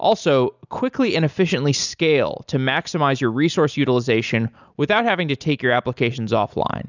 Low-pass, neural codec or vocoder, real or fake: 7.2 kHz; none; real